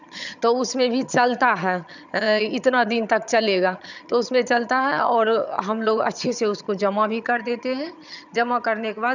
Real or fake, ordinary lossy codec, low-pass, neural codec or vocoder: fake; none; 7.2 kHz; vocoder, 22.05 kHz, 80 mel bands, HiFi-GAN